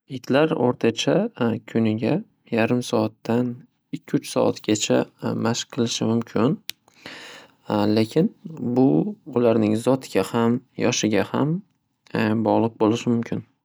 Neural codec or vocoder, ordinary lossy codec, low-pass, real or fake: none; none; none; real